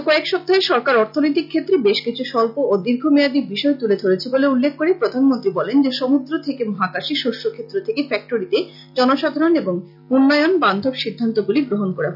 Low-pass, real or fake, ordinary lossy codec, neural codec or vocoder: 5.4 kHz; real; none; none